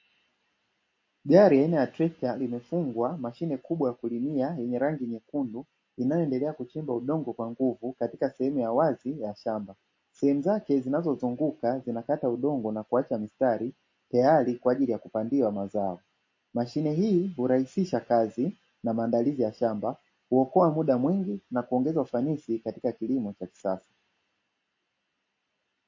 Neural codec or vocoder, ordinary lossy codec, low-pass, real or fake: none; MP3, 32 kbps; 7.2 kHz; real